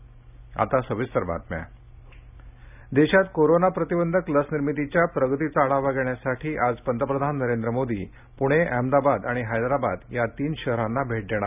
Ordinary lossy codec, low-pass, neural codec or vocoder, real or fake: none; 3.6 kHz; none; real